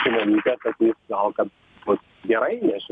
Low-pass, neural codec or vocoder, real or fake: 9.9 kHz; none; real